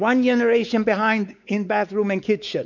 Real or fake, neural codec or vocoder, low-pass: fake; codec, 16 kHz, 4 kbps, X-Codec, WavLM features, trained on Multilingual LibriSpeech; 7.2 kHz